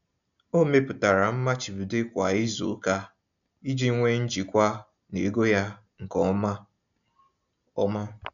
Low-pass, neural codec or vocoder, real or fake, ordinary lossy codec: 7.2 kHz; none; real; none